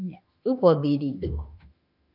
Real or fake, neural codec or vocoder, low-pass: fake; autoencoder, 48 kHz, 32 numbers a frame, DAC-VAE, trained on Japanese speech; 5.4 kHz